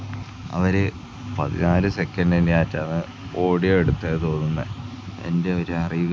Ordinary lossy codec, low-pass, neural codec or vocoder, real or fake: none; none; none; real